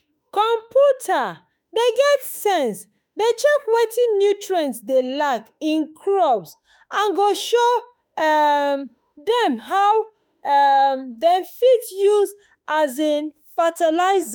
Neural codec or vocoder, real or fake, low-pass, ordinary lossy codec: autoencoder, 48 kHz, 32 numbers a frame, DAC-VAE, trained on Japanese speech; fake; none; none